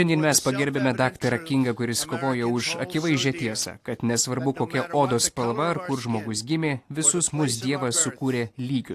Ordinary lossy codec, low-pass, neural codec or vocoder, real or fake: AAC, 64 kbps; 14.4 kHz; none; real